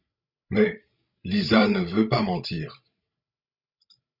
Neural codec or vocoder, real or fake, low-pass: codec, 16 kHz, 16 kbps, FreqCodec, larger model; fake; 5.4 kHz